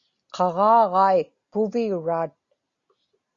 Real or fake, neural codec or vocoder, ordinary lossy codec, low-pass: real; none; Opus, 64 kbps; 7.2 kHz